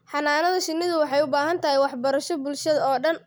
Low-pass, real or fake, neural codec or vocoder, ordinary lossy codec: none; real; none; none